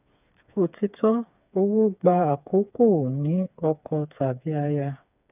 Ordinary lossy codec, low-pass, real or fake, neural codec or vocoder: none; 3.6 kHz; fake; codec, 16 kHz, 4 kbps, FreqCodec, smaller model